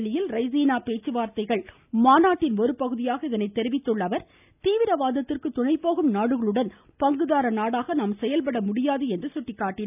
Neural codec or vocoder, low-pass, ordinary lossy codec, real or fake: none; 3.6 kHz; none; real